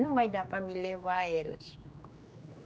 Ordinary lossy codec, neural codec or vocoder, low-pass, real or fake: none; codec, 16 kHz, 2 kbps, X-Codec, HuBERT features, trained on general audio; none; fake